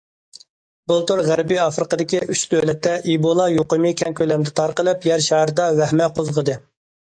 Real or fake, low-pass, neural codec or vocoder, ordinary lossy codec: fake; 9.9 kHz; codec, 44.1 kHz, 7.8 kbps, DAC; AAC, 64 kbps